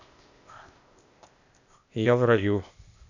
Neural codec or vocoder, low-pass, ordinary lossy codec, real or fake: codec, 16 kHz, 0.8 kbps, ZipCodec; 7.2 kHz; none; fake